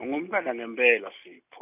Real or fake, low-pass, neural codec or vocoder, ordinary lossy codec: real; 3.6 kHz; none; none